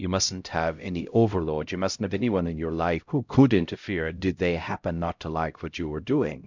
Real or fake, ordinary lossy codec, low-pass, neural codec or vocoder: fake; MP3, 64 kbps; 7.2 kHz; codec, 16 kHz, 0.5 kbps, X-Codec, HuBERT features, trained on LibriSpeech